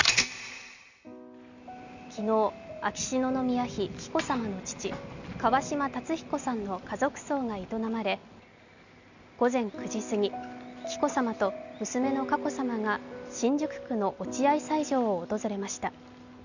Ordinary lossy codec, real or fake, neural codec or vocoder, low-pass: none; real; none; 7.2 kHz